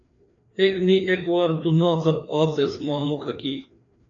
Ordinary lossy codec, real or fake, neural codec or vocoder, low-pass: AAC, 48 kbps; fake; codec, 16 kHz, 2 kbps, FreqCodec, larger model; 7.2 kHz